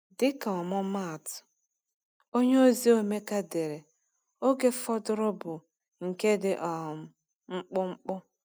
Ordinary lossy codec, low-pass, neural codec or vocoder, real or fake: none; none; none; real